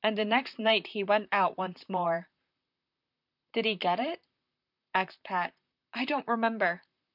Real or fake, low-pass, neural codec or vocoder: fake; 5.4 kHz; vocoder, 44.1 kHz, 128 mel bands, Pupu-Vocoder